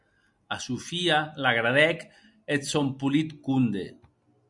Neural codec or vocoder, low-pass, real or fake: none; 10.8 kHz; real